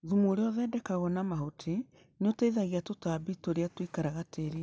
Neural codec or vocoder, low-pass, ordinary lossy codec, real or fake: none; none; none; real